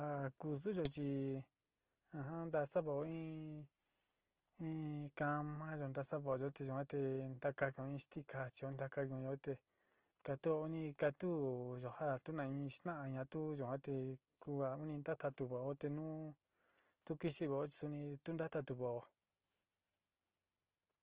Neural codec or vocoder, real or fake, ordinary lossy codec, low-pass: none; real; Opus, 16 kbps; 3.6 kHz